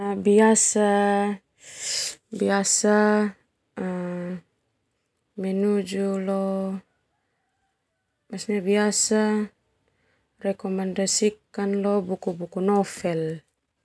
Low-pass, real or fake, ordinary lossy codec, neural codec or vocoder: none; real; none; none